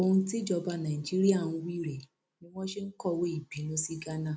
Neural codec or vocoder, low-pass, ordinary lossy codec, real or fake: none; none; none; real